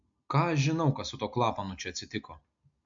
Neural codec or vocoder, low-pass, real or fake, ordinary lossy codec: none; 7.2 kHz; real; MP3, 48 kbps